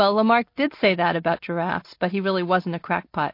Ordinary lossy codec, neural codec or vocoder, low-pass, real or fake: MP3, 32 kbps; none; 5.4 kHz; real